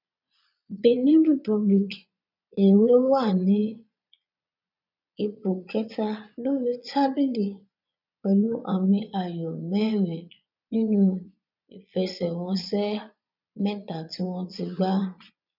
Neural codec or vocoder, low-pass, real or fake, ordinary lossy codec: vocoder, 22.05 kHz, 80 mel bands, Vocos; 5.4 kHz; fake; none